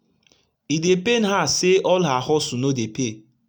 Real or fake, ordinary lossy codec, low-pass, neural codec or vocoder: real; none; none; none